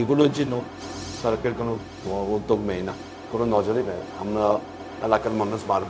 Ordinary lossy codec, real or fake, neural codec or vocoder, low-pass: none; fake; codec, 16 kHz, 0.4 kbps, LongCat-Audio-Codec; none